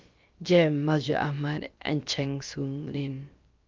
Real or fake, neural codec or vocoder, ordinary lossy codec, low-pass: fake; codec, 16 kHz, about 1 kbps, DyCAST, with the encoder's durations; Opus, 32 kbps; 7.2 kHz